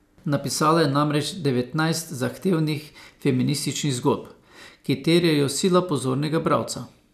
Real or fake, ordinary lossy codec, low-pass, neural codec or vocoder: real; none; 14.4 kHz; none